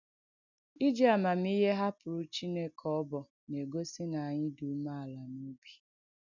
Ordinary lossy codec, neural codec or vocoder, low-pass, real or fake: none; none; 7.2 kHz; real